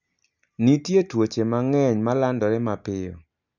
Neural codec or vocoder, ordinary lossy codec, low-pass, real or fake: none; none; 7.2 kHz; real